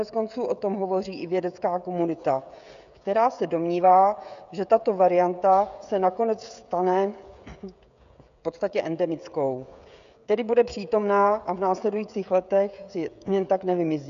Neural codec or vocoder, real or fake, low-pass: codec, 16 kHz, 16 kbps, FreqCodec, smaller model; fake; 7.2 kHz